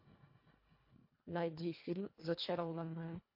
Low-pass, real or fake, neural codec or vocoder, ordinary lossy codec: 5.4 kHz; fake; codec, 24 kHz, 1.5 kbps, HILCodec; MP3, 32 kbps